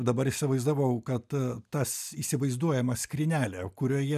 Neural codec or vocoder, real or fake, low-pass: none; real; 14.4 kHz